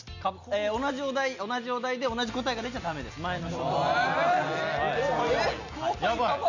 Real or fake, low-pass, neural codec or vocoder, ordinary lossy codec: real; 7.2 kHz; none; none